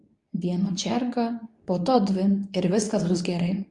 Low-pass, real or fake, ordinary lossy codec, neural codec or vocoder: 10.8 kHz; fake; MP3, 64 kbps; codec, 24 kHz, 0.9 kbps, WavTokenizer, medium speech release version 2